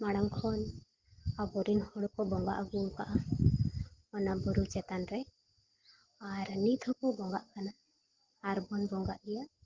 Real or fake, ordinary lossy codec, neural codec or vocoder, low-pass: real; Opus, 16 kbps; none; 7.2 kHz